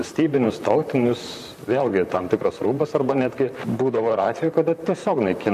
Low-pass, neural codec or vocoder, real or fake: 14.4 kHz; vocoder, 44.1 kHz, 128 mel bands, Pupu-Vocoder; fake